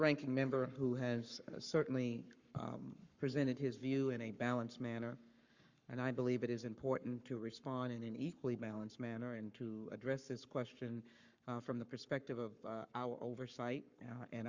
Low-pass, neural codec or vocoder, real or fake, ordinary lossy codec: 7.2 kHz; codec, 44.1 kHz, 7.8 kbps, Pupu-Codec; fake; Opus, 64 kbps